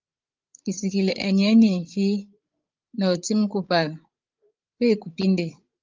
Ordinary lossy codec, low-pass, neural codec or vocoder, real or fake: Opus, 32 kbps; 7.2 kHz; codec, 16 kHz, 16 kbps, FreqCodec, larger model; fake